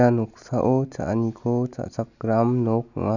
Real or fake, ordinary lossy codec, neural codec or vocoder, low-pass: real; none; none; 7.2 kHz